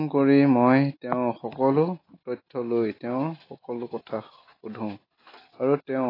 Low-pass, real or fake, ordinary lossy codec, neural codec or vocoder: 5.4 kHz; real; AAC, 24 kbps; none